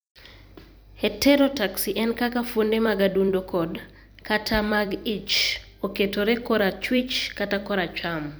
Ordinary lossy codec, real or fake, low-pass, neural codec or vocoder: none; fake; none; vocoder, 44.1 kHz, 128 mel bands every 256 samples, BigVGAN v2